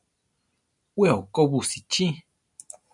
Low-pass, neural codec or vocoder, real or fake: 10.8 kHz; none; real